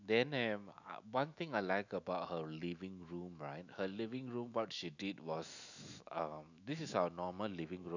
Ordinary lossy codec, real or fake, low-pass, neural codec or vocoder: none; fake; 7.2 kHz; autoencoder, 48 kHz, 128 numbers a frame, DAC-VAE, trained on Japanese speech